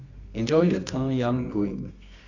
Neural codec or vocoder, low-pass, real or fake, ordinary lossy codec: codec, 24 kHz, 0.9 kbps, WavTokenizer, medium music audio release; 7.2 kHz; fake; none